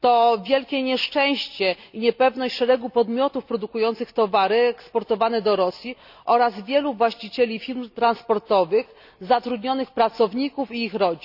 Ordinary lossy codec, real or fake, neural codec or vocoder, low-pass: none; real; none; 5.4 kHz